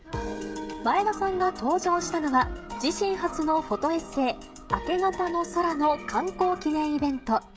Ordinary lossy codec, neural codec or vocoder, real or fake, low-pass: none; codec, 16 kHz, 16 kbps, FreqCodec, smaller model; fake; none